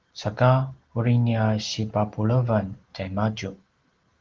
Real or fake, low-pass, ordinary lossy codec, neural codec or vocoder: real; 7.2 kHz; Opus, 16 kbps; none